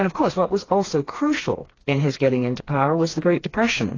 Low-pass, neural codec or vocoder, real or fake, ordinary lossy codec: 7.2 kHz; codec, 16 kHz, 2 kbps, FreqCodec, smaller model; fake; AAC, 32 kbps